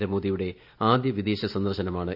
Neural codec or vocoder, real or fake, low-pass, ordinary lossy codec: none; real; 5.4 kHz; none